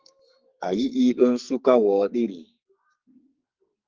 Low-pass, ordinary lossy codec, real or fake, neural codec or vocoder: 7.2 kHz; Opus, 24 kbps; fake; codec, 44.1 kHz, 2.6 kbps, SNAC